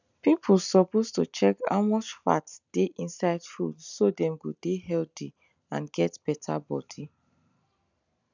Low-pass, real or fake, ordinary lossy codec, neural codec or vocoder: 7.2 kHz; real; none; none